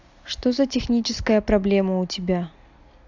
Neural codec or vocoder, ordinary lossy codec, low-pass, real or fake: none; AAC, 48 kbps; 7.2 kHz; real